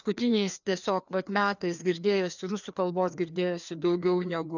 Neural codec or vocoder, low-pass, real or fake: codec, 16 kHz, 2 kbps, FreqCodec, larger model; 7.2 kHz; fake